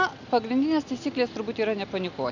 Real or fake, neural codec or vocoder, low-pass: real; none; 7.2 kHz